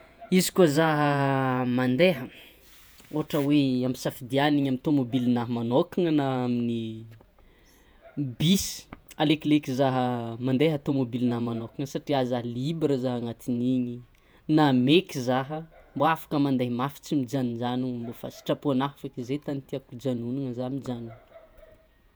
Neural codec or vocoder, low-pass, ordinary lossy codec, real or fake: vocoder, 48 kHz, 128 mel bands, Vocos; none; none; fake